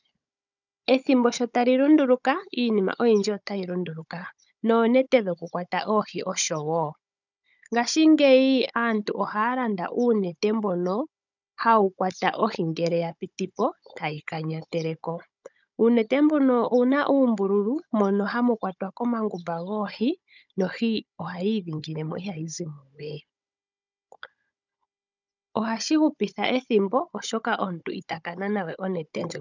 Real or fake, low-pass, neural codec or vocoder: fake; 7.2 kHz; codec, 16 kHz, 16 kbps, FunCodec, trained on Chinese and English, 50 frames a second